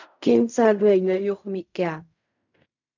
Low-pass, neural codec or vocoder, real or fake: 7.2 kHz; codec, 16 kHz in and 24 kHz out, 0.4 kbps, LongCat-Audio-Codec, fine tuned four codebook decoder; fake